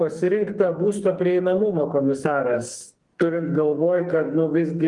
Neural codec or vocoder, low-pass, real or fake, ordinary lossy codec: codec, 44.1 kHz, 2.6 kbps, SNAC; 10.8 kHz; fake; Opus, 24 kbps